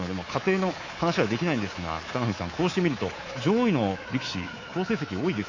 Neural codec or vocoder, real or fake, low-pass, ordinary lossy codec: codec, 24 kHz, 3.1 kbps, DualCodec; fake; 7.2 kHz; AAC, 32 kbps